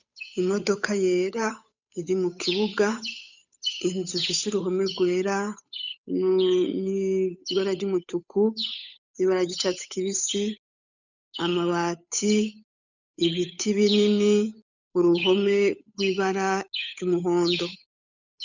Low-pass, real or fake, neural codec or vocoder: 7.2 kHz; fake; codec, 16 kHz, 8 kbps, FunCodec, trained on Chinese and English, 25 frames a second